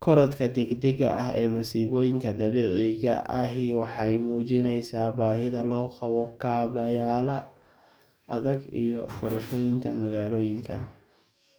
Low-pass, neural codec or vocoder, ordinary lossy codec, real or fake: none; codec, 44.1 kHz, 2.6 kbps, DAC; none; fake